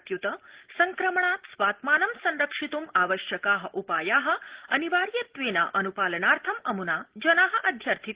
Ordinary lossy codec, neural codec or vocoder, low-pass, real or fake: Opus, 16 kbps; none; 3.6 kHz; real